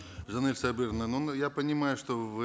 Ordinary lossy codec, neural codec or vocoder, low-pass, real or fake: none; none; none; real